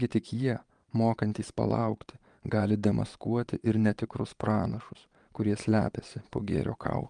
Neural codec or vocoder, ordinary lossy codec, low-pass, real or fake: vocoder, 22.05 kHz, 80 mel bands, Vocos; Opus, 32 kbps; 9.9 kHz; fake